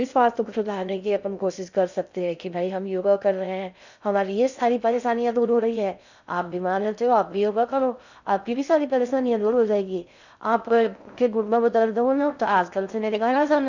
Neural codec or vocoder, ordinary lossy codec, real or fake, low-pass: codec, 16 kHz in and 24 kHz out, 0.6 kbps, FocalCodec, streaming, 2048 codes; none; fake; 7.2 kHz